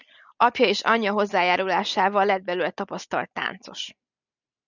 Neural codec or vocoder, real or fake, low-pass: none; real; 7.2 kHz